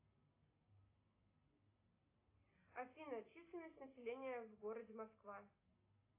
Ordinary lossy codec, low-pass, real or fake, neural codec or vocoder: AAC, 24 kbps; 3.6 kHz; real; none